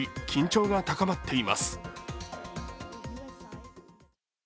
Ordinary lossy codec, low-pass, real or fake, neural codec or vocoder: none; none; real; none